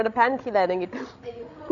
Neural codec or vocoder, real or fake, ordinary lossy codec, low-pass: codec, 16 kHz, 16 kbps, FreqCodec, larger model; fake; none; 7.2 kHz